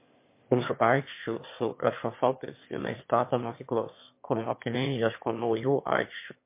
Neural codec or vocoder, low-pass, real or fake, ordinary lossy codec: autoencoder, 22.05 kHz, a latent of 192 numbers a frame, VITS, trained on one speaker; 3.6 kHz; fake; MP3, 24 kbps